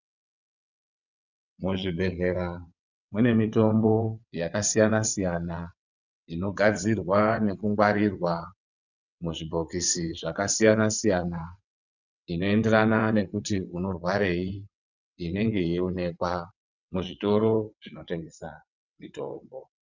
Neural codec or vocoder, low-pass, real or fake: vocoder, 22.05 kHz, 80 mel bands, WaveNeXt; 7.2 kHz; fake